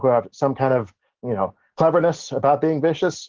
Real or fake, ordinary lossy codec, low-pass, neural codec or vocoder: real; Opus, 16 kbps; 7.2 kHz; none